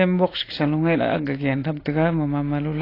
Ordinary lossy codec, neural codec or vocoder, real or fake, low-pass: AAC, 24 kbps; none; real; 5.4 kHz